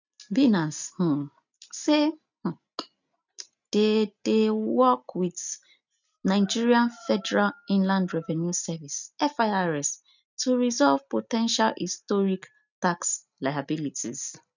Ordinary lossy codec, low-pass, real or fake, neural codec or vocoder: none; 7.2 kHz; real; none